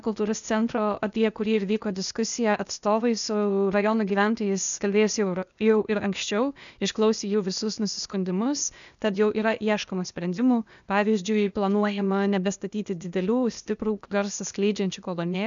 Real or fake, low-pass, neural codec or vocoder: fake; 7.2 kHz; codec, 16 kHz, 0.8 kbps, ZipCodec